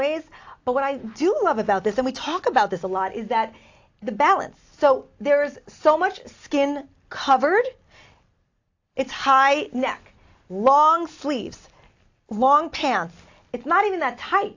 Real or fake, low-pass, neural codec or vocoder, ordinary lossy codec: real; 7.2 kHz; none; AAC, 48 kbps